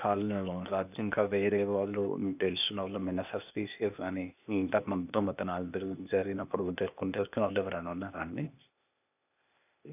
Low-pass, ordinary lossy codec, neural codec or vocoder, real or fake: 3.6 kHz; none; codec, 16 kHz, 0.8 kbps, ZipCodec; fake